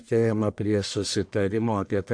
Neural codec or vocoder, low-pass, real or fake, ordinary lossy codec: codec, 44.1 kHz, 1.7 kbps, Pupu-Codec; 9.9 kHz; fake; AAC, 64 kbps